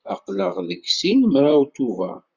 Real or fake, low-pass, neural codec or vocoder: fake; 7.2 kHz; vocoder, 44.1 kHz, 128 mel bands, Pupu-Vocoder